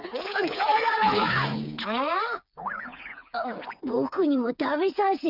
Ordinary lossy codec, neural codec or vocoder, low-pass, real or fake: none; codec, 16 kHz, 4 kbps, FreqCodec, smaller model; 5.4 kHz; fake